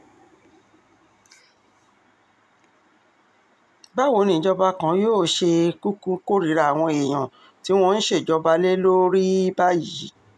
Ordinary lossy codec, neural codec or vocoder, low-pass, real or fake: none; none; none; real